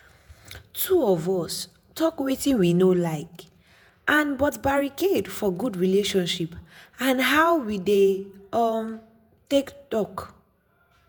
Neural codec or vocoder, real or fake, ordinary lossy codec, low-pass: vocoder, 48 kHz, 128 mel bands, Vocos; fake; none; none